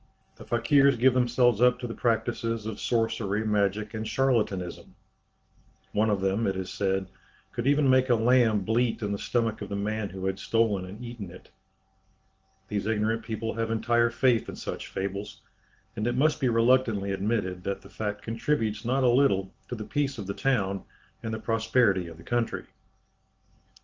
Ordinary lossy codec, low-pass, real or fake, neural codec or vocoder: Opus, 16 kbps; 7.2 kHz; real; none